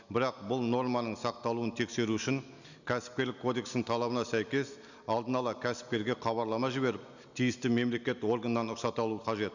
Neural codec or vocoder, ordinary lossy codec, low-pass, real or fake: none; none; 7.2 kHz; real